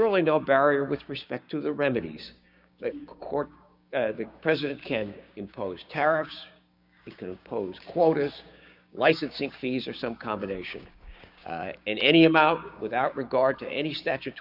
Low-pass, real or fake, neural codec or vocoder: 5.4 kHz; fake; codec, 16 kHz, 6 kbps, DAC